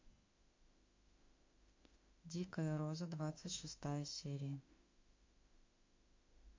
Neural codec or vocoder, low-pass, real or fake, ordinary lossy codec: autoencoder, 48 kHz, 32 numbers a frame, DAC-VAE, trained on Japanese speech; 7.2 kHz; fake; MP3, 48 kbps